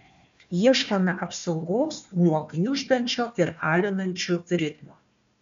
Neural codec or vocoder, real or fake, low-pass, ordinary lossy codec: codec, 16 kHz, 1 kbps, FunCodec, trained on Chinese and English, 50 frames a second; fake; 7.2 kHz; MP3, 64 kbps